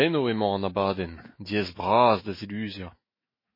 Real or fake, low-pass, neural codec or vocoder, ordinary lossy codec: fake; 5.4 kHz; autoencoder, 48 kHz, 128 numbers a frame, DAC-VAE, trained on Japanese speech; MP3, 24 kbps